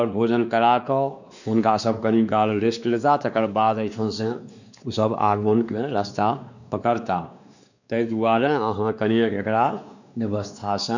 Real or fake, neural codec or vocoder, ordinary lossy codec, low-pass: fake; codec, 16 kHz, 2 kbps, X-Codec, WavLM features, trained on Multilingual LibriSpeech; none; 7.2 kHz